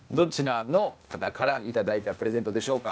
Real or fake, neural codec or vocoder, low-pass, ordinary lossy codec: fake; codec, 16 kHz, 0.8 kbps, ZipCodec; none; none